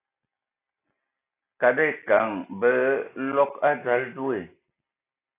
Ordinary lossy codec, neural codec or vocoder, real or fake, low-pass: AAC, 24 kbps; none; real; 3.6 kHz